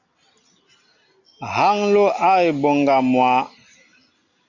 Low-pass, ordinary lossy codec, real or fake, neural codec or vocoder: 7.2 kHz; Opus, 64 kbps; real; none